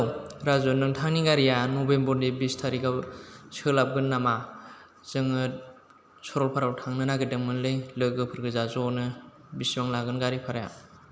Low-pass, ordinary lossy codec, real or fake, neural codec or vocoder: none; none; real; none